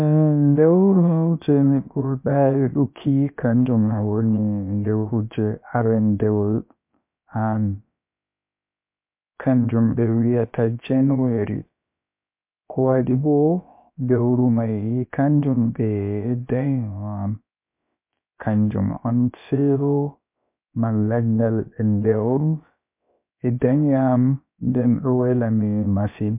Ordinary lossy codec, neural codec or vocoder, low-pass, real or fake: MP3, 32 kbps; codec, 16 kHz, about 1 kbps, DyCAST, with the encoder's durations; 3.6 kHz; fake